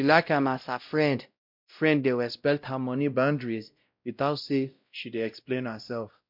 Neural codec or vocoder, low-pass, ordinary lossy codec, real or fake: codec, 16 kHz, 0.5 kbps, X-Codec, WavLM features, trained on Multilingual LibriSpeech; 5.4 kHz; MP3, 48 kbps; fake